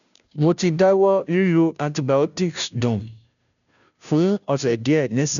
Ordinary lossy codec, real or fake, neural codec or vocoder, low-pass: none; fake; codec, 16 kHz, 0.5 kbps, FunCodec, trained on Chinese and English, 25 frames a second; 7.2 kHz